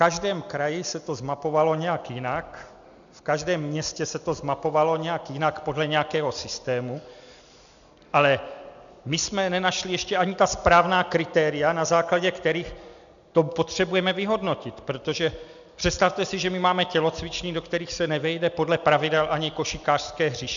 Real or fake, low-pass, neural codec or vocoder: real; 7.2 kHz; none